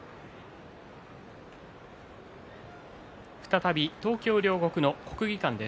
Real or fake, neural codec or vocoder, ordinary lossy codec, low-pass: real; none; none; none